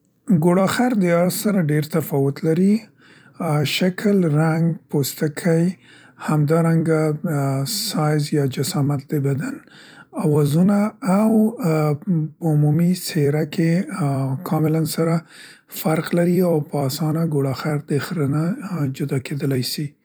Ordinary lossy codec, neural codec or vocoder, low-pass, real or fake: none; vocoder, 44.1 kHz, 128 mel bands every 256 samples, BigVGAN v2; none; fake